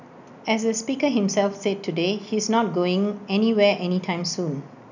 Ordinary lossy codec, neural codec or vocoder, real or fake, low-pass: none; none; real; 7.2 kHz